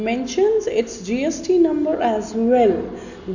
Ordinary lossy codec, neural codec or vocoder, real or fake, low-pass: none; none; real; 7.2 kHz